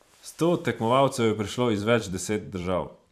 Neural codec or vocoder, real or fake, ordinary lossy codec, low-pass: vocoder, 44.1 kHz, 128 mel bands every 512 samples, BigVGAN v2; fake; none; 14.4 kHz